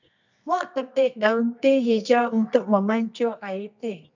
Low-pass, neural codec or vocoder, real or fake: 7.2 kHz; codec, 24 kHz, 0.9 kbps, WavTokenizer, medium music audio release; fake